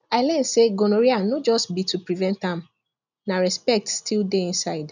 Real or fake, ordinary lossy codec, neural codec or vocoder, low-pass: real; none; none; 7.2 kHz